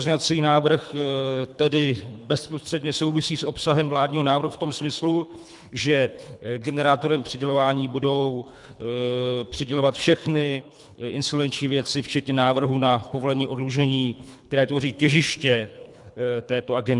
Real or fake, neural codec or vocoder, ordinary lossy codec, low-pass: fake; codec, 24 kHz, 3 kbps, HILCodec; MP3, 96 kbps; 10.8 kHz